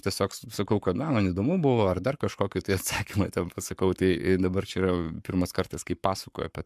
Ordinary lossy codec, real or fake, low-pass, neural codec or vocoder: MP3, 96 kbps; fake; 14.4 kHz; codec, 44.1 kHz, 7.8 kbps, Pupu-Codec